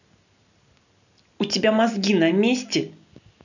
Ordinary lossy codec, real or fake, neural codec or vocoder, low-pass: none; real; none; 7.2 kHz